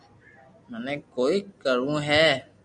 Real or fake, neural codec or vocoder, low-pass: real; none; 9.9 kHz